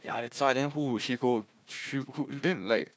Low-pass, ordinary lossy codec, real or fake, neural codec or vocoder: none; none; fake; codec, 16 kHz, 1 kbps, FunCodec, trained on Chinese and English, 50 frames a second